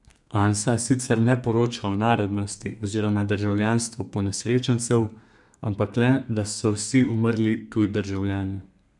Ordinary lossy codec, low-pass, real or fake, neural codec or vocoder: none; 10.8 kHz; fake; codec, 32 kHz, 1.9 kbps, SNAC